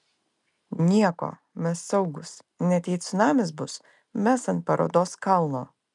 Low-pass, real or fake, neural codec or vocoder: 10.8 kHz; real; none